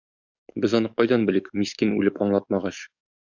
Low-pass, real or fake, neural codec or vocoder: 7.2 kHz; fake; codec, 44.1 kHz, 7.8 kbps, DAC